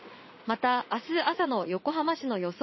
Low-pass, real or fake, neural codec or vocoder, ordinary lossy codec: 7.2 kHz; fake; vocoder, 44.1 kHz, 80 mel bands, Vocos; MP3, 24 kbps